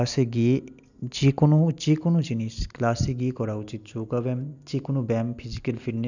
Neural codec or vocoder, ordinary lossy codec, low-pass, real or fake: none; none; 7.2 kHz; real